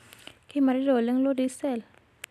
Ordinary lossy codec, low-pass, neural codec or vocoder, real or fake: none; 14.4 kHz; none; real